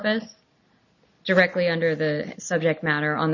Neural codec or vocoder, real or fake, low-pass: none; real; 7.2 kHz